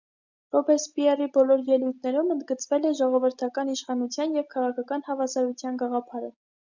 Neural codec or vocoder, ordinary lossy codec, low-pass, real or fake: none; Opus, 64 kbps; 7.2 kHz; real